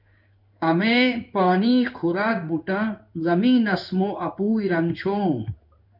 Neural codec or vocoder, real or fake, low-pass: codec, 16 kHz in and 24 kHz out, 1 kbps, XY-Tokenizer; fake; 5.4 kHz